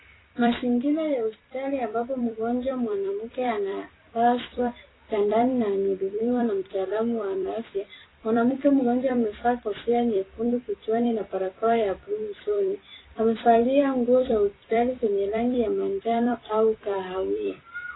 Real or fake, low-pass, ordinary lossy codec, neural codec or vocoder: fake; 7.2 kHz; AAC, 16 kbps; vocoder, 44.1 kHz, 128 mel bands every 256 samples, BigVGAN v2